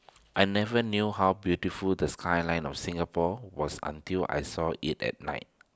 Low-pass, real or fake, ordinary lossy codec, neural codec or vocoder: none; real; none; none